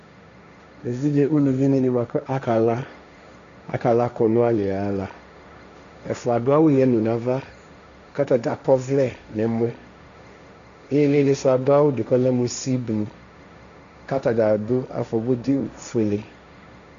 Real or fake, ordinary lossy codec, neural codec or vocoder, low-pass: fake; MP3, 96 kbps; codec, 16 kHz, 1.1 kbps, Voila-Tokenizer; 7.2 kHz